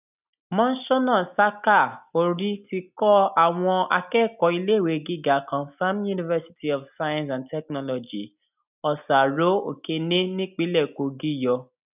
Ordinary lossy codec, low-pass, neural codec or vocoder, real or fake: none; 3.6 kHz; none; real